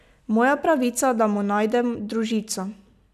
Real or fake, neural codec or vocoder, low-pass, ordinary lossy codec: real; none; 14.4 kHz; none